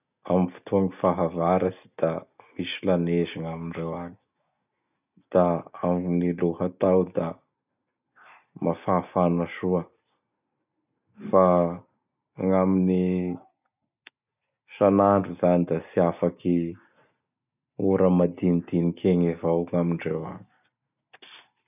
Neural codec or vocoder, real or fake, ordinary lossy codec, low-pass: none; real; none; 3.6 kHz